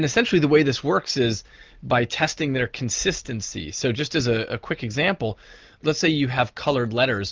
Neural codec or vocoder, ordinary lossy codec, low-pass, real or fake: none; Opus, 32 kbps; 7.2 kHz; real